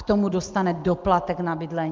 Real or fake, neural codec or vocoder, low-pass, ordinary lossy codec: real; none; 7.2 kHz; Opus, 24 kbps